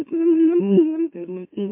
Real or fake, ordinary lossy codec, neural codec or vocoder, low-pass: fake; MP3, 32 kbps; autoencoder, 44.1 kHz, a latent of 192 numbers a frame, MeloTTS; 3.6 kHz